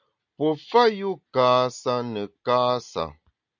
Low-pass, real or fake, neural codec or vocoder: 7.2 kHz; real; none